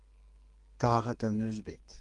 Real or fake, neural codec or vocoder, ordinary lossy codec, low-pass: fake; codec, 32 kHz, 1.9 kbps, SNAC; Opus, 24 kbps; 10.8 kHz